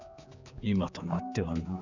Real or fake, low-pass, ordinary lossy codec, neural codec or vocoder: fake; 7.2 kHz; none; codec, 16 kHz, 4 kbps, X-Codec, HuBERT features, trained on general audio